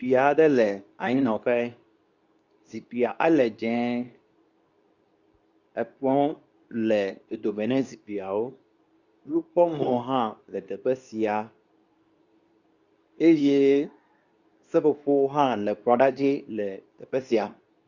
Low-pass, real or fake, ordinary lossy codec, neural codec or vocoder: 7.2 kHz; fake; Opus, 64 kbps; codec, 24 kHz, 0.9 kbps, WavTokenizer, medium speech release version 2